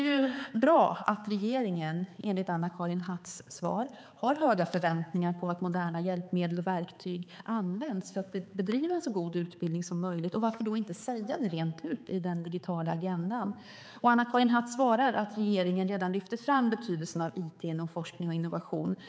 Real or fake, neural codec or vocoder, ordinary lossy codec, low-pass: fake; codec, 16 kHz, 4 kbps, X-Codec, HuBERT features, trained on balanced general audio; none; none